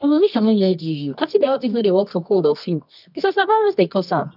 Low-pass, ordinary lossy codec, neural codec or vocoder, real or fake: 5.4 kHz; none; codec, 24 kHz, 0.9 kbps, WavTokenizer, medium music audio release; fake